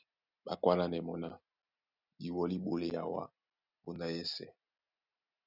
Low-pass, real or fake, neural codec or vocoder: 5.4 kHz; real; none